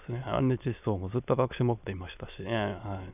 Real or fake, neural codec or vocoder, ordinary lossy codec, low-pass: fake; autoencoder, 22.05 kHz, a latent of 192 numbers a frame, VITS, trained on many speakers; none; 3.6 kHz